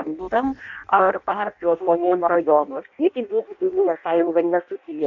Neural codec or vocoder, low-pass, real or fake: codec, 16 kHz in and 24 kHz out, 0.6 kbps, FireRedTTS-2 codec; 7.2 kHz; fake